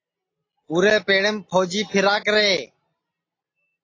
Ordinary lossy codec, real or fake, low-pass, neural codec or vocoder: AAC, 32 kbps; real; 7.2 kHz; none